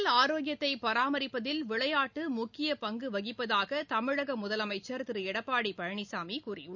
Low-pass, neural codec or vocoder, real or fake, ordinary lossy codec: 7.2 kHz; none; real; none